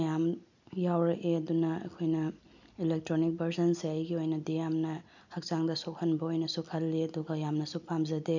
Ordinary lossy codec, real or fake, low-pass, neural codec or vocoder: none; real; 7.2 kHz; none